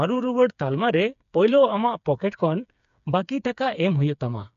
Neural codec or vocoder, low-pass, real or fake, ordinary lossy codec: codec, 16 kHz, 4 kbps, FreqCodec, smaller model; 7.2 kHz; fake; none